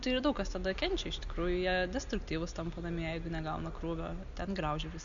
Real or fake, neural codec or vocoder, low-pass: real; none; 7.2 kHz